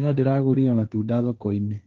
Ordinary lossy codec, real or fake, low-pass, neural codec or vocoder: Opus, 32 kbps; fake; 7.2 kHz; codec, 16 kHz, 1.1 kbps, Voila-Tokenizer